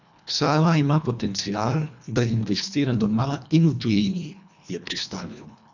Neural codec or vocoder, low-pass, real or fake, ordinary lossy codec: codec, 24 kHz, 1.5 kbps, HILCodec; 7.2 kHz; fake; none